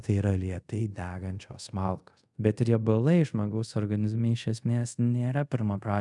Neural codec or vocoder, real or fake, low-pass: codec, 24 kHz, 0.5 kbps, DualCodec; fake; 10.8 kHz